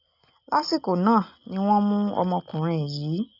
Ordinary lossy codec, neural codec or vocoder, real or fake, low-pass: none; none; real; 5.4 kHz